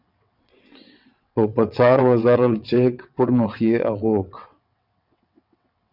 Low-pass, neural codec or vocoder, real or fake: 5.4 kHz; vocoder, 22.05 kHz, 80 mel bands, WaveNeXt; fake